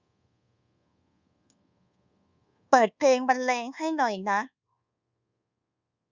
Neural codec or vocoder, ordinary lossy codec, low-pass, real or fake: codec, 24 kHz, 1.2 kbps, DualCodec; Opus, 64 kbps; 7.2 kHz; fake